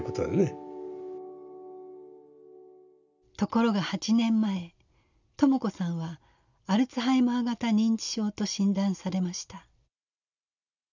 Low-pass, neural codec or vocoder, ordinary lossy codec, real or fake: 7.2 kHz; none; none; real